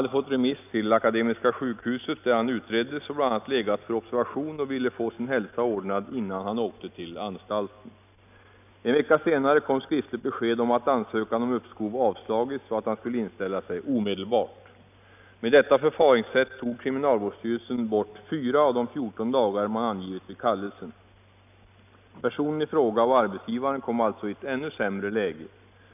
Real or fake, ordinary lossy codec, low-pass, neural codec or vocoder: real; none; 3.6 kHz; none